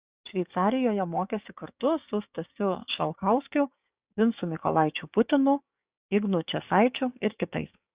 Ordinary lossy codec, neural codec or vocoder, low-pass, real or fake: Opus, 64 kbps; codec, 16 kHz, 6 kbps, DAC; 3.6 kHz; fake